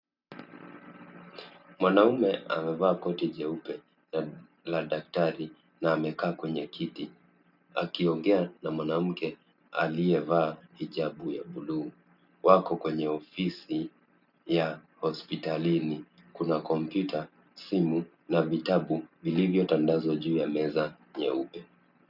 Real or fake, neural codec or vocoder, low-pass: real; none; 5.4 kHz